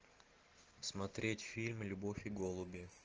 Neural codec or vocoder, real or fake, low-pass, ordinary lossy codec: none; real; 7.2 kHz; Opus, 16 kbps